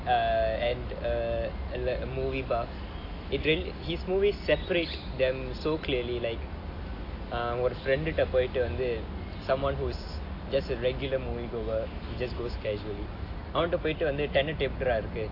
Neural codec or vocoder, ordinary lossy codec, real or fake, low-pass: none; AAC, 32 kbps; real; 5.4 kHz